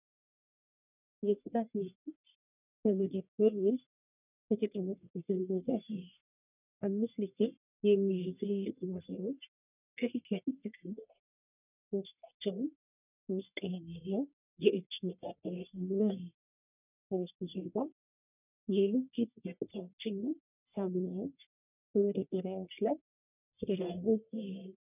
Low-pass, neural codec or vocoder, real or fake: 3.6 kHz; codec, 44.1 kHz, 1.7 kbps, Pupu-Codec; fake